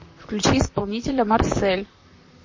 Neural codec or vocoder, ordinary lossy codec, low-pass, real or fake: vocoder, 44.1 kHz, 128 mel bands, Pupu-Vocoder; MP3, 32 kbps; 7.2 kHz; fake